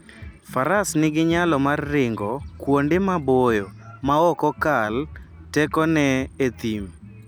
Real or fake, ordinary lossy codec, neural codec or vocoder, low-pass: real; none; none; none